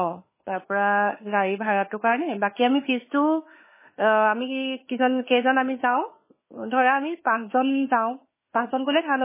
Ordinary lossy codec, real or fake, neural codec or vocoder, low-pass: MP3, 16 kbps; fake; autoencoder, 48 kHz, 32 numbers a frame, DAC-VAE, trained on Japanese speech; 3.6 kHz